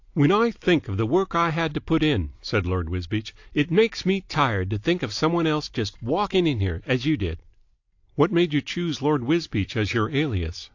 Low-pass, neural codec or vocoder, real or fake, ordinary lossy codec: 7.2 kHz; none; real; AAC, 48 kbps